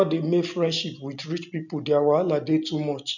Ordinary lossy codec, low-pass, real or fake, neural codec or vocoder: none; 7.2 kHz; real; none